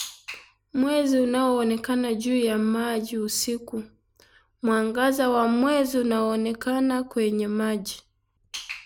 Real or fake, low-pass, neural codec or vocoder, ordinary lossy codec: real; none; none; none